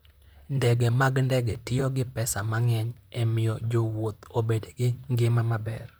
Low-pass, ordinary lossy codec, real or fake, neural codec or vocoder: none; none; fake; vocoder, 44.1 kHz, 128 mel bands, Pupu-Vocoder